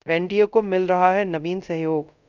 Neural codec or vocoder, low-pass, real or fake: codec, 24 kHz, 0.5 kbps, DualCodec; 7.2 kHz; fake